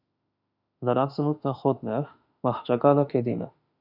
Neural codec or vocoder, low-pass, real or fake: autoencoder, 48 kHz, 32 numbers a frame, DAC-VAE, trained on Japanese speech; 5.4 kHz; fake